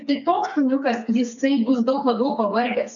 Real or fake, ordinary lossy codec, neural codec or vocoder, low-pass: fake; MP3, 64 kbps; codec, 16 kHz, 4 kbps, FreqCodec, smaller model; 7.2 kHz